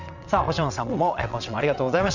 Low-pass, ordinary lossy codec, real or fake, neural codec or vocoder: 7.2 kHz; none; fake; vocoder, 22.05 kHz, 80 mel bands, WaveNeXt